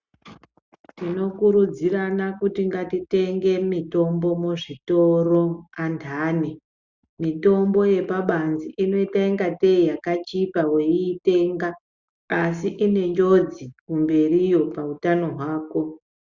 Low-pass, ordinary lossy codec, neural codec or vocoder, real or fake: 7.2 kHz; Opus, 64 kbps; none; real